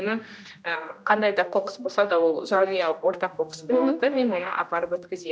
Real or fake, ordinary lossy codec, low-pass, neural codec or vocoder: fake; none; none; codec, 16 kHz, 1 kbps, X-Codec, HuBERT features, trained on general audio